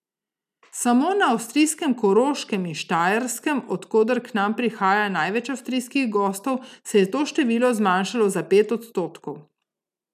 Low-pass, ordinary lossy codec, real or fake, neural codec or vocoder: 14.4 kHz; none; real; none